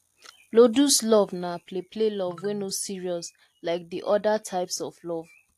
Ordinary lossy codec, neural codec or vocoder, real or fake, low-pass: AAC, 64 kbps; none; real; 14.4 kHz